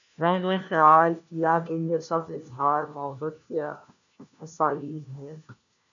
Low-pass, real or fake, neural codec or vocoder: 7.2 kHz; fake; codec, 16 kHz, 1 kbps, FunCodec, trained on LibriTTS, 50 frames a second